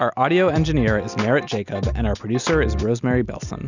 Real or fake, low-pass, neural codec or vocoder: real; 7.2 kHz; none